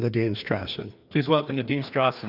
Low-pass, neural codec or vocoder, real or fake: 5.4 kHz; codec, 16 kHz, 2 kbps, FreqCodec, larger model; fake